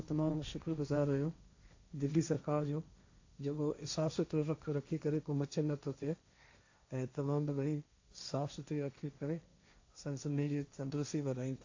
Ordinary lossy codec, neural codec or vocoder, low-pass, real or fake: AAC, 48 kbps; codec, 16 kHz, 1.1 kbps, Voila-Tokenizer; 7.2 kHz; fake